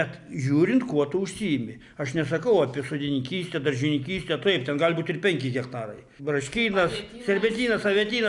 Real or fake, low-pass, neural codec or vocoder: real; 10.8 kHz; none